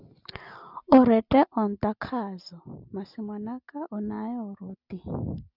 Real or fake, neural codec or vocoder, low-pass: real; none; 5.4 kHz